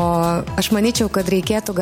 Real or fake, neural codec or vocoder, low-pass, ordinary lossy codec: real; none; 19.8 kHz; MP3, 64 kbps